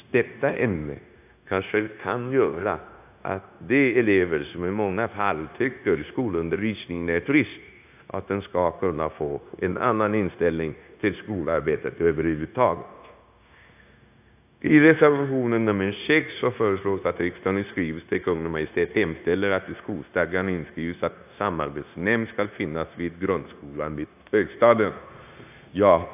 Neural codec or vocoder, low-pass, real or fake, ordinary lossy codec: codec, 16 kHz, 0.9 kbps, LongCat-Audio-Codec; 3.6 kHz; fake; AAC, 32 kbps